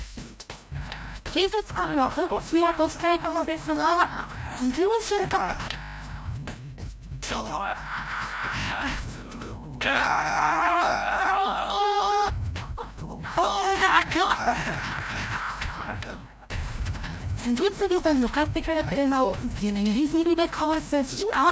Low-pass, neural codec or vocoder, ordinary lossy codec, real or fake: none; codec, 16 kHz, 0.5 kbps, FreqCodec, larger model; none; fake